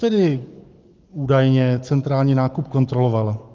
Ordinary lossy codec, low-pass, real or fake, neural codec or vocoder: Opus, 24 kbps; 7.2 kHz; fake; codec, 44.1 kHz, 7.8 kbps, Pupu-Codec